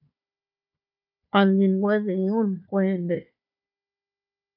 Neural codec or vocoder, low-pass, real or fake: codec, 16 kHz, 4 kbps, FunCodec, trained on Chinese and English, 50 frames a second; 5.4 kHz; fake